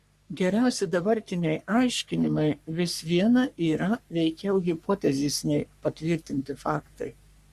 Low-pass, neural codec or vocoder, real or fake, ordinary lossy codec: 14.4 kHz; codec, 44.1 kHz, 3.4 kbps, Pupu-Codec; fake; Opus, 64 kbps